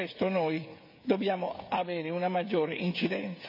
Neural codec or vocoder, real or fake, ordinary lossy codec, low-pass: codec, 16 kHz, 16 kbps, FreqCodec, smaller model; fake; MP3, 32 kbps; 5.4 kHz